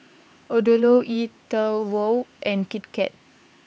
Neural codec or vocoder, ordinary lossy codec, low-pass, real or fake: codec, 16 kHz, 4 kbps, X-Codec, HuBERT features, trained on LibriSpeech; none; none; fake